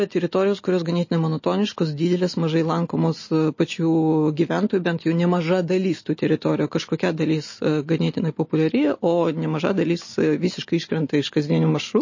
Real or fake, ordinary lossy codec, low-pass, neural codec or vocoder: real; MP3, 32 kbps; 7.2 kHz; none